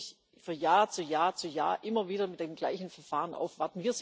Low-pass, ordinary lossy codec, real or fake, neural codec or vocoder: none; none; real; none